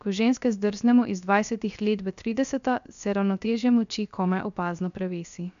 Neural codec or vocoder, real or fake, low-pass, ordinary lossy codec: codec, 16 kHz, 0.3 kbps, FocalCodec; fake; 7.2 kHz; none